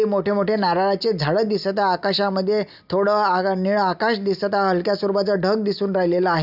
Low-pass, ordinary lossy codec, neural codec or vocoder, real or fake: 5.4 kHz; none; none; real